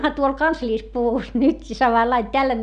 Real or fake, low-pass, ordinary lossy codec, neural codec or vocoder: real; 9.9 kHz; none; none